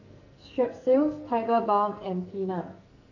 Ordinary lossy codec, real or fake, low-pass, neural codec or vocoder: none; fake; 7.2 kHz; codec, 44.1 kHz, 2.6 kbps, SNAC